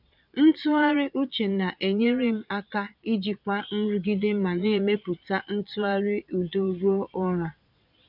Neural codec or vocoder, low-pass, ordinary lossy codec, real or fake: vocoder, 22.05 kHz, 80 mel bands, Vocos; 5.4 kHz; none; fake